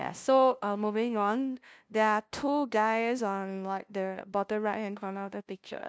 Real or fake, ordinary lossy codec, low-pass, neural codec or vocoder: fake; none; none; codec, 16 kHz, 0.5 kbps, FunCodec, trained on LibriTTS, 25 frames a second